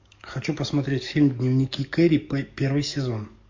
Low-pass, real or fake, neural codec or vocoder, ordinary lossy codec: 7.2 kHz; fake; codec, 44.1 kHz, 7.8 kbps, DAC; MP3, 48 kbps